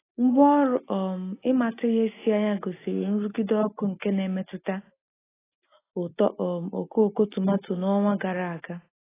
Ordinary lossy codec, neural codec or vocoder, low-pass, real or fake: AAC, 16 kbps; none; 3.6 kHz; real